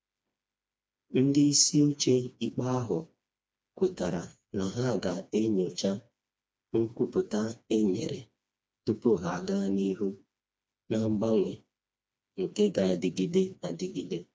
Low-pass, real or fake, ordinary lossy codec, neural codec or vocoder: none; fake; none; codec, 16 kHz, 2 kbps, FreqCodec, smaller model